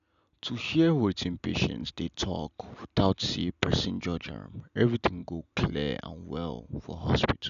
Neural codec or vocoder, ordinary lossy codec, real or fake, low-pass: none; none; real; 7.2 kHz